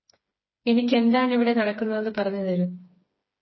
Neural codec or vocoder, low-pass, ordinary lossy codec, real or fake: codec, 16 kHz, 4 kbps, FreqCodec, smaller model; 7.2 kHz; MP3, 24 kbps; fake